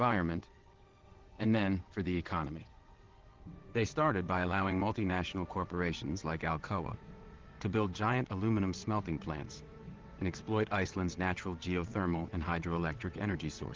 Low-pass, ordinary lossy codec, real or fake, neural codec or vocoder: 7.2 kHz; Opus, 16 kbps; fake; vocoder, 22.05 kHz, 80 mel bands, WaveNeXt